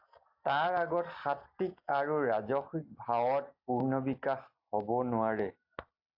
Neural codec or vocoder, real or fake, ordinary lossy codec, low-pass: none; real; AAC, 48 kbps; 5.4 kHz